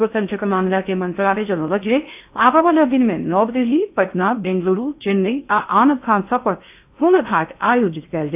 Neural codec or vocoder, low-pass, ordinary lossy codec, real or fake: codec, 16 kHz in and 24 kHz out, 0.6 kbps, FocalCodec, streaming, 4096 codes; 3.6 kHz; AAC, 32 kbps; fake